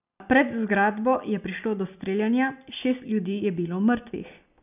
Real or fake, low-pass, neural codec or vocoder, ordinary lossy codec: real; 3.6 kHz; none; none